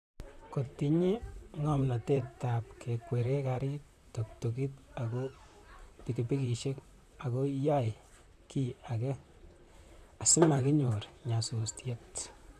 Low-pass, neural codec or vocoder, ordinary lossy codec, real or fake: 14.4 kHz; vocoder, 44.1 kHz, 128 mel bands, Pupu-Vocoder; none; fake